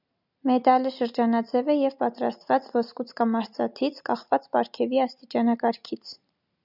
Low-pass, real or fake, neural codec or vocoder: 5.4 kHz; real; none